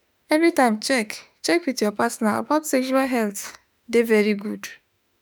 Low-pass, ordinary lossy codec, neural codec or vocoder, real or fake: none; none; autoencoder, 48 kHz, 32 numbers a frame, DAC-VAE, trained on Japanese speech; fake